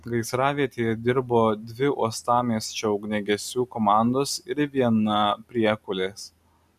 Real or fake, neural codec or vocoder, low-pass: real; none; 14.4 kHz